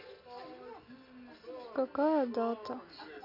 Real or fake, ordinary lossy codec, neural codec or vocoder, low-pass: real; none; none; 5.4 kHz